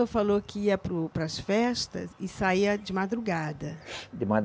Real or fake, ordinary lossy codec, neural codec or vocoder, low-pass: real; none; none; none